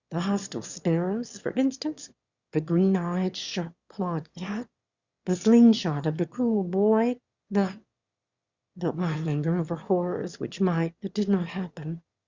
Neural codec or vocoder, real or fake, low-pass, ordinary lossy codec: autoencoder, 22.05 kHz, a latent of 192 numbers a frame, VITS, trained on one speaker; fake; 7.2 kHz; Opus, 64 kbps